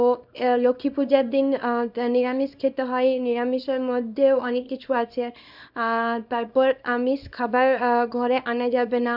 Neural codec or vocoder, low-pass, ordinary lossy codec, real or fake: codec, 24 kHz, 0.9 kbps, WavTokenizer, small release; 5.4 kHz; none; fake